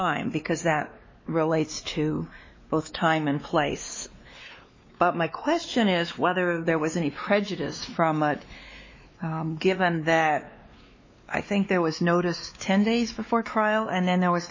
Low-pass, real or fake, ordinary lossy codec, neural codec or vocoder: 7.2 kHz; fake; MP3, 32 kbps; codec, 16 kHz, 2 kbps, X-Codec, WavLM features, trained on Multilingual LibriSpeech